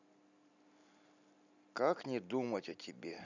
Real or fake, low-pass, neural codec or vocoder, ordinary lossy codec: real; 7.2 kHz; none; none